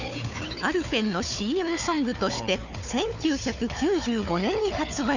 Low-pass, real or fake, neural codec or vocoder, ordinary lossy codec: 7.2 kHz; fake; codec, 16 kHz, 4 kbps, FunCodec, trained on Chinese and English, 50 frames a second; none